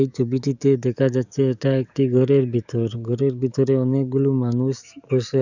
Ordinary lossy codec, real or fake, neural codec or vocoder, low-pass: none; fake; codec, 44.1 kHz, 7.8 kbps, DAC; 7.2 kHz